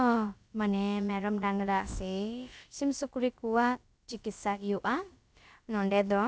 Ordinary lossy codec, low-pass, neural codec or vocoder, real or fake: none; none; codec, 16 kHz, about 1 kbps, DyCAST, with the encoder's durations; fake